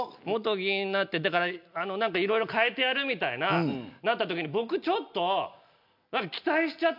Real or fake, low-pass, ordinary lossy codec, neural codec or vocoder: real; 5.4 kHz; MP3, 48 kbps; none